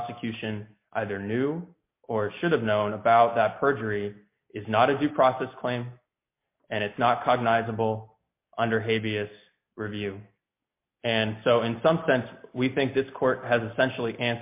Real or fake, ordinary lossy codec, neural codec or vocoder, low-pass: real; MP3, 24 kbps; none; 3.6 kHz